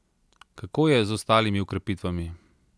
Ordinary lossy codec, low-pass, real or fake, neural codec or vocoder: none; none; real; none